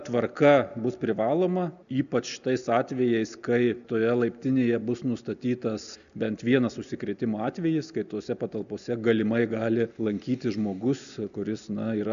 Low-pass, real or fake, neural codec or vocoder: 7.2 kHz; real; none